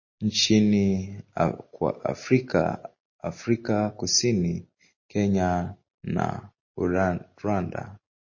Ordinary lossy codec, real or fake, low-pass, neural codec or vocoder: MP3, 32 kbps; real; 7.2 kHz; none